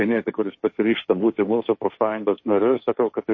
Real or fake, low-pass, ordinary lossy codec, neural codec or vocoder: fake; 7.2 kHz; MP3, 32 kbps; codec, 16 kHz, 1.1 kbps, Voila-Tokenizer